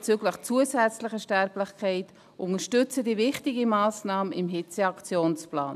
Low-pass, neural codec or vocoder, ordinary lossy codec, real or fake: 14.4 kHz; none; none; real